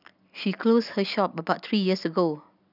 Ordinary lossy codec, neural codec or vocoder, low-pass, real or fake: none; none; 5.4 kHz; real